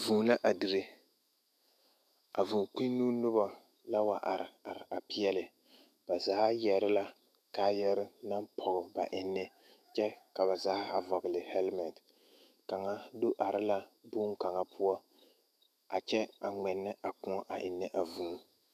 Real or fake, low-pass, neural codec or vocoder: fake; 14.4 kHz; autoencoder, 48 kHz, 128 numbers a frame, DAC-VAE, trained on Japanese speech